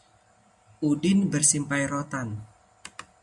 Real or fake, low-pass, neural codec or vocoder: real; 10.8 kHz; none